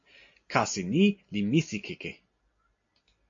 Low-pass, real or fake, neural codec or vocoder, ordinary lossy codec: 7.2 kHz; real; none; AAC, 48 kbps